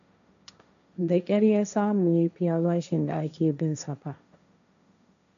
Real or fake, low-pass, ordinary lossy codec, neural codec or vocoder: fake; 7.2 kHz; none; codec, 16 kHz, 1.1 kbps, Voila-Tokenizer